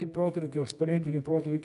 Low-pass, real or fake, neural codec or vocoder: 10.8 kHz; fake; codec, 24 kHz, 0.9 kbps, WavTokenizer, medium music audio release